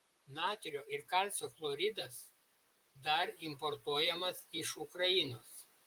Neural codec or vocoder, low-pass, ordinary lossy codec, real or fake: vocoder, 44.1 kHz, 128 mel bands, Pupu-Vocoder; 19.8 kHz; Opus, 32 kbps; fake